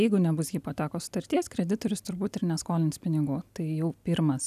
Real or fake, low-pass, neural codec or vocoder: real; 14.4 kHz; none